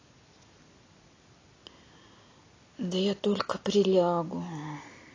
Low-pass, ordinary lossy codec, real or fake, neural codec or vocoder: 7.2 kHz; AAC, 32 kbps; real; none